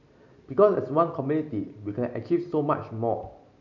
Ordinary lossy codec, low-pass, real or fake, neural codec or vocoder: none; 7.2 kHz; real; none